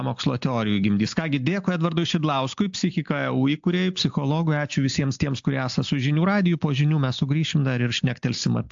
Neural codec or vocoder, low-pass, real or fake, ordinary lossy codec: none; 7.2 kHz; real; AAC, 64 kbps